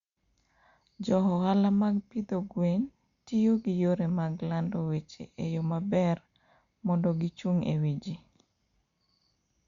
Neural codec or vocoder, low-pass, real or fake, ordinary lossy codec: none; 7.2 kHz; real; Opus, 64 kbps